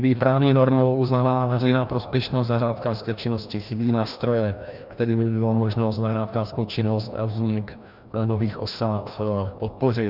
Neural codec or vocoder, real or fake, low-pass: codec, 16 kHz, 1 kbps, FreqCodec, larger model; fake; 5.4 kHz